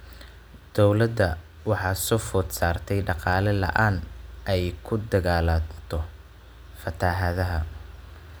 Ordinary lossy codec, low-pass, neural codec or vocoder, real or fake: none; none; none; real